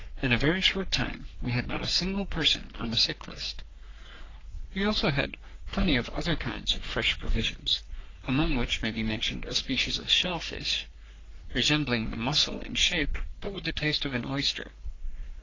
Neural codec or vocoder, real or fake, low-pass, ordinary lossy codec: codec, 44.1 kHz, 3.4 kbps, Pupu-Codec; fake; 7.2 kHz; AAC, 32 kbps